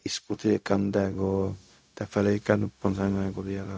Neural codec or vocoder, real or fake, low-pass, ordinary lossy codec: codec, 16 kHz, 0.4 kbps, LongCat-Audio-Codec; fake; none; none